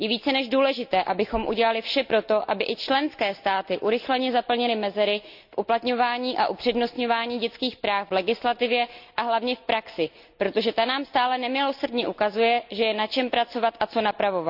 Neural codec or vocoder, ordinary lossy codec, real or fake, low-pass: none; none; real; 5.4 kHz